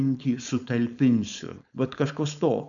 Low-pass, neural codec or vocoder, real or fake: 7.2 kHz; codec, 16 kHz, 4.8 kbps, FACodec; fake